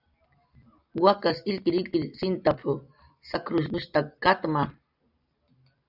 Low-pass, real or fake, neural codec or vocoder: 5.4 kHz; real; none